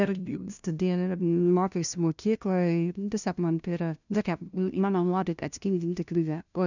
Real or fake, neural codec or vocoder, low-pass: fake; codec, 16 kHz, 0.5 kbps, FunCodec, trained on LibriTTS, 25 frames a second; 7.2 kHz